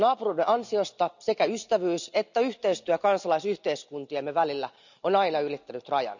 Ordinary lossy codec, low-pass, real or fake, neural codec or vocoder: none; 7.2 kHz; real; none